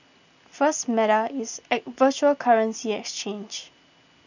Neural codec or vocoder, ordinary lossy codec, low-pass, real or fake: none; none; 7.2 kHz; real